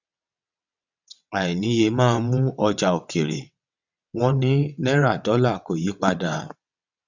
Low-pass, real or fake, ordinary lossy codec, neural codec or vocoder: 7.2 kHz; fake; none; vocoder, 22.05 kHz, 80 mel bands, WaveNeXt